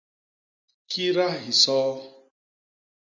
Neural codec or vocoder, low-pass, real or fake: none; 7.2 kHz; real